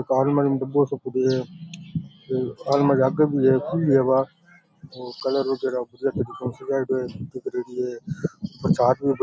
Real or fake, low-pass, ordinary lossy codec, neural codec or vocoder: real; none; none; none